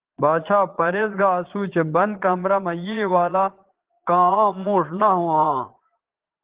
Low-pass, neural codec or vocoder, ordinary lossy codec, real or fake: 3.6 kHz; vocoder, 22.05 kHz, 80 mel bands, Vocos; Opus, 16 kbps; fake